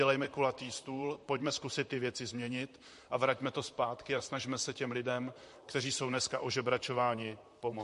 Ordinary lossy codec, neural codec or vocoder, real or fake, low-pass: MP3, 48 kbps; vocoder, 44.1 kHz, 128 mel bands, Pupu-Vocoder; fake; 14.4 kHz